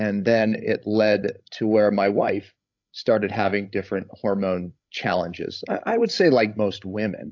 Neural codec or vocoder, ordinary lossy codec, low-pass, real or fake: codec, 16 kHz, 16 kbps, FreqCodec, larger model; AAC, 48 kbps; 7.2 kHz; fake